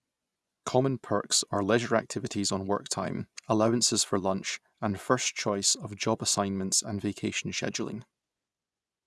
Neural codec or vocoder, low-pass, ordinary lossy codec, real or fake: vocoder, 24 kHz, 100 mel bands, Vocos; none; none; fake